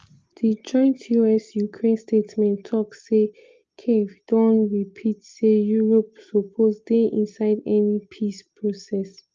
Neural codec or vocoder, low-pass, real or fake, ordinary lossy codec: none; 7.2 kHz; real; Opus, 24 kbps